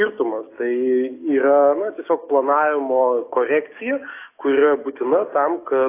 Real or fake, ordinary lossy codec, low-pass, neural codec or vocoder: fake; AAC, 24 kbps; 3.6 kHz; codec, 44.1 kHz, 7.8 kbps, DAC